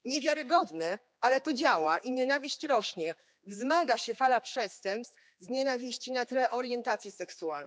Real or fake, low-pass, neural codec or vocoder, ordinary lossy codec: fake; none; codec, 16 kHz, 2 kbps, X-Codec, HuBERT features, trained on general audio; none